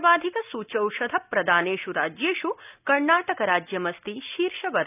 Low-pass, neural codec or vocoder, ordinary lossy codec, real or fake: 3.6 kHz; none; none; real